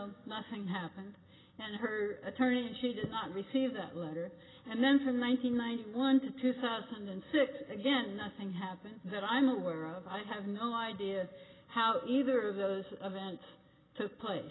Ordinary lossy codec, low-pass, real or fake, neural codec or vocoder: AAC, 16 kbps; 7.2 kHz; real; none